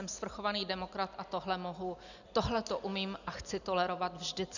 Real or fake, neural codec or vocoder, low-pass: real; none; 7.2 kHz